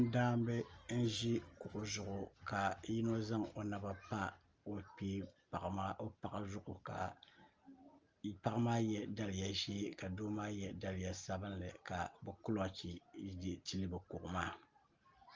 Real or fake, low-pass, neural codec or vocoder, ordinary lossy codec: real; 7.2 kHz; none; Opus, 24 kbps